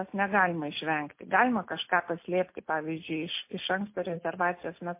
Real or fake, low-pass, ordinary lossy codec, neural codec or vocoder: real; 3.6 kHz; MP3, 24 kbps; none